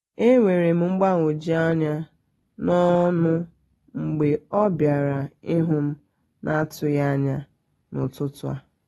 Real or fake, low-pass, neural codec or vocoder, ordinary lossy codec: real; 19.8 kHz; none; AAC, 32 kbps